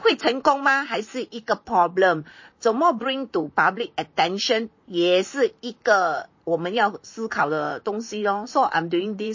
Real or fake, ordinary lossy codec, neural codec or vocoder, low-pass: fake; MP3, 32 kbps; vocoder, 44.1 kHz, 128 mel bands, Pupu-Vocoder; 7.2 kHz